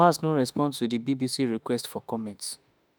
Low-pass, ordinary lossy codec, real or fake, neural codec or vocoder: none; none; fake; autoencoder, 48 kHz, 32 numbers a frame, DAC-VAE, trained on Japanese speech